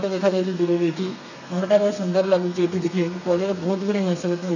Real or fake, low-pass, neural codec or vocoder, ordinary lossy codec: fake; 7.2 kHz; codec, 32 kHz, 1.9 kbps, SNAC; none